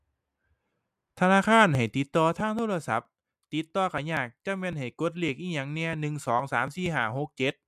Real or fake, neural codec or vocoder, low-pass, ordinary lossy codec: real; none; 14.4 kHz; MP3, 96 kbps